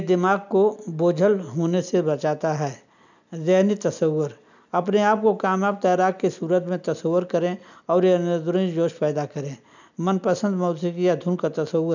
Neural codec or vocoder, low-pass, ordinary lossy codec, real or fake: none; 7.2 kHz; none; real